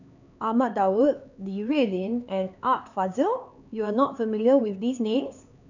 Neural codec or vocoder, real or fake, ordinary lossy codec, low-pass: codec, 16 kHz, 4 kbps, X-Codec, HuBERT features, trained on LibriSpeech; fake; none; 7.2 kHz